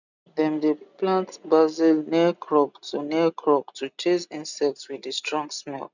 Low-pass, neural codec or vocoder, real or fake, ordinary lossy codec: 7.2 kHz; none; real; none